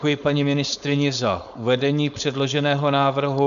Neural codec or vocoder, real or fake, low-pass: codec, 16 kHz, 4.8 kbps, FACodec; fake; 7.2 kHz